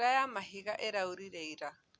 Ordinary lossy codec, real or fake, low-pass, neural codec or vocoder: none; real; none; none